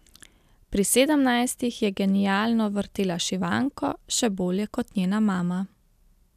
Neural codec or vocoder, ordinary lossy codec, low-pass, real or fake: none; none; 14.4 kHz; real